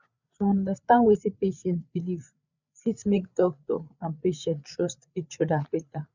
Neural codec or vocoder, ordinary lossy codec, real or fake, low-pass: codec, 16 kHz, 8 kbps, FreqCodec, larger model; none; fake; 7.2 kHz